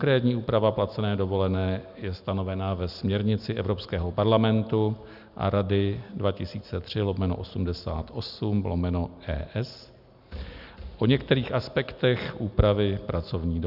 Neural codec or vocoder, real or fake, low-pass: none; real; 5.4 kHz